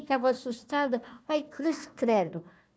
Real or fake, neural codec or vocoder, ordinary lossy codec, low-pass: fake; codec, 16 kHz, 1 kbps, FunCodec, trained on Chinese and English, 50 frames a second; none; none